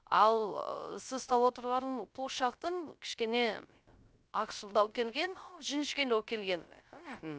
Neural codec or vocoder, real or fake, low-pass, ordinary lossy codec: codec, 16 kHz, 0.3 kbps, FocalCodec; fake; none; none